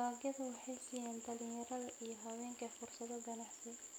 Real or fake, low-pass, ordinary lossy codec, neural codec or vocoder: real; none; none; none